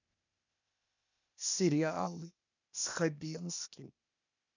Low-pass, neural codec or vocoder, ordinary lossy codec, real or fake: 7.2 kHz; codec, 16 kHz, 0.8 kbps, ZipCodec; none; fake